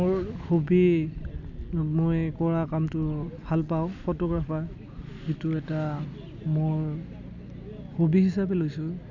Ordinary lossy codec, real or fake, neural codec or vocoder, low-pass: none; real; none; 7.2 kHz